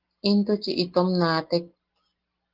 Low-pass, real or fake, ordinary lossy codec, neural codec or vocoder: 5.4 kHz; real; Opus, 16 kbps; none